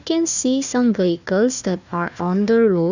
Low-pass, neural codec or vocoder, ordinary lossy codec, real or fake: 7.2 kHz; codec, 16 kHz, 1 kbps, FunCodec, trained on Chinese and English, 50 frames a second; none; fake